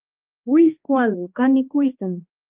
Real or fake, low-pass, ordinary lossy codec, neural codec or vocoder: fake; 3.6 kHz; Opus, 32 kbps; codec, 16 kHz, 1 kbps, X-Codec, HuBERT features, trained on balanced general audio